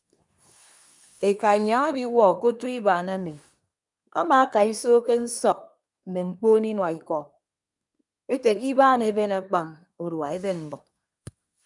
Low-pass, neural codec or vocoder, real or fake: 10.8 kHz; codec, 24 kHz, 1 kbps, SNAC; fake